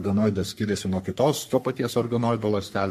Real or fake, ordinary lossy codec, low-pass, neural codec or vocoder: fake; MP3, 64 kbps; 14.4 kHz; codec, 44.1 kHz, 3.4 kbps, Pupu-Codec